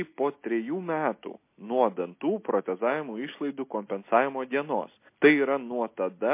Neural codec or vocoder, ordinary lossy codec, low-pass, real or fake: none; MP3, 24 kbps; 3.6 kHz; real